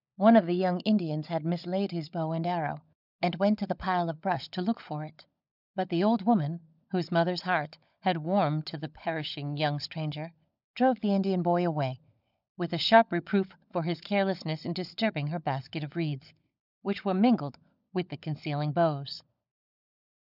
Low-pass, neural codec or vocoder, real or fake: 5.4 kHz; codec, 16 kHz, 16 kbps, FunCodec, trained on LibriTTS, 50 frames a second; fake